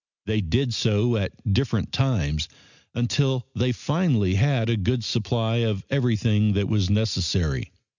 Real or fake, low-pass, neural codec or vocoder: real; 7.2 kHz; none